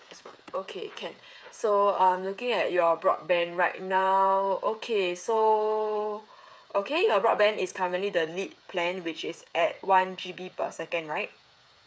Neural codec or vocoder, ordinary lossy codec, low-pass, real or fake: codec, 16 kHz, 8 kbps, FreqCodec, smaller model; none; none; fake